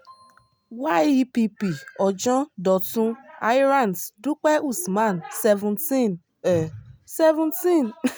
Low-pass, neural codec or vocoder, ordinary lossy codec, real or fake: none; none; none; real